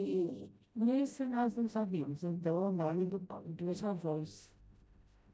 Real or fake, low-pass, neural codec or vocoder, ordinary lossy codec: fake; none; codec, 16 kHz, 0.5 kbps, FreqCodec, smaller model; none